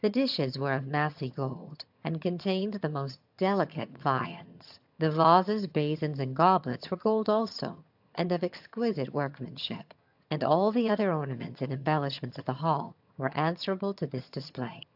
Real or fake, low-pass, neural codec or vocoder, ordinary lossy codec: fake; 5.4 kHz; vocoder, 22.05 kHz, 80 mel bands, HiFi-GAN; AAC, 48 kbps